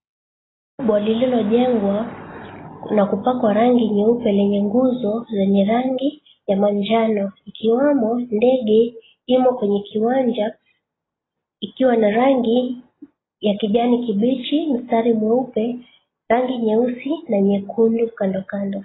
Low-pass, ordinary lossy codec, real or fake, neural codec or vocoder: 7.2 kHz; AAC, 16 kbps; real; none